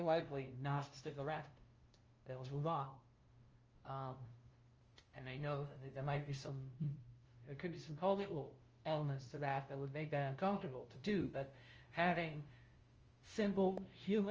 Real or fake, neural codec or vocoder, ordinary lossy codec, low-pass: fake; codec, 16 kHz, 0.5 kbps, FunCodec, trained on LibriTTS, 25 frames a second; Opus, 24 kbps; 7.2 kHz